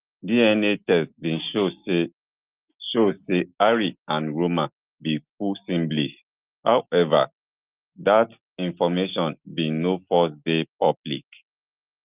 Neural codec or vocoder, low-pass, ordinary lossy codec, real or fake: none; 3.6 kHz; Opus, 24 kbps; real